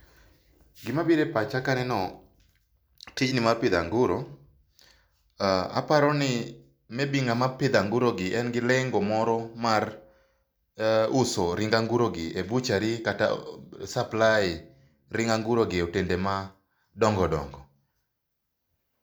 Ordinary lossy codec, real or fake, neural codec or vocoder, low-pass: none; real; none; none